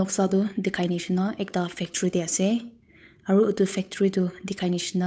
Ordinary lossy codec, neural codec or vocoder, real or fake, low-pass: none; codec, 16 kHz, 8 kbps, FunCodec, trained on Chinese and English, 25 frames a second; fake; none